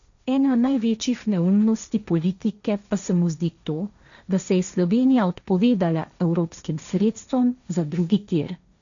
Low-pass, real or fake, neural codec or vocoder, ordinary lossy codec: 7.2 kHz; fake; codec, 16 kHz, 1.1 kbps, Voila-Tokenizer; none